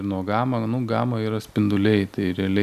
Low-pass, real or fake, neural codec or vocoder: 14.4 kHz; real; none